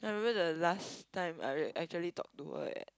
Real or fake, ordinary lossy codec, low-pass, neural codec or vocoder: real; none; none; none